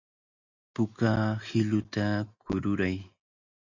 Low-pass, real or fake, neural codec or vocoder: 7.2 kHz; real; none